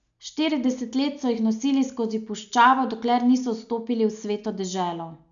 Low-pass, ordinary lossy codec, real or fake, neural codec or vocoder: 7.2 kHz; none; real; none